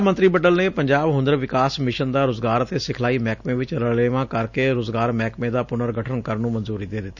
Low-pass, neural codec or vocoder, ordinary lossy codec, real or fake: 7.2 kHz; none; none; real